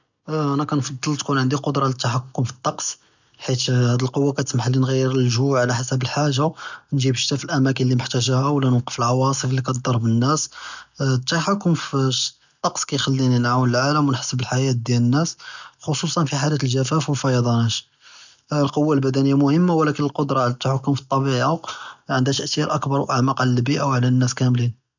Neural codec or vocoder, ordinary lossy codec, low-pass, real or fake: none; none; 7.2 kHz; real